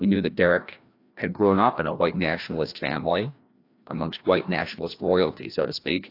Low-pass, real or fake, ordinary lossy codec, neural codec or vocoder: 5.4 kHz; fake; AAC, 32 kbps; codec, 16 kHz, 1 kbps, FreqCodec, larger model